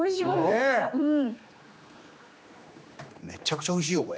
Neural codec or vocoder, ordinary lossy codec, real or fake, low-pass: codec, 16 kHz, 2 kbps, X-Codec, HuBERT features, trained on general audio; none; fake; none